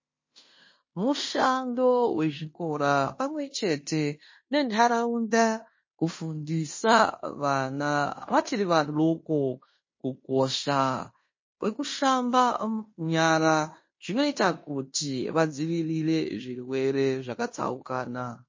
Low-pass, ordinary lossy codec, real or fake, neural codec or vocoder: 7.2 kHz; MP3, 32 kbps; fake; codec, 16 kHz in and 24 kHz out, 0.9 kbps, LongCat-Audio-Codec, fine tuned four codebook decoder